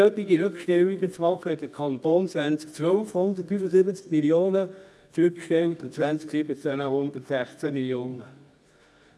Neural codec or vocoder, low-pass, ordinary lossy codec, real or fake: codec, 24 kHz, 0.9 kbps, WavTokenizer, medium music audio release; none; none; fake